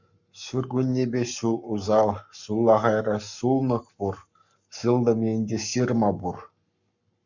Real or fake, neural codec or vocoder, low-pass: fake; codec, 44.1 kHz, 7.8 kbps, Pupu-Codec; 7.2 kHz